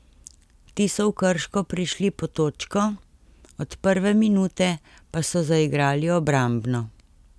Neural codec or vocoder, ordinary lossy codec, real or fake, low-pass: none; none; real; none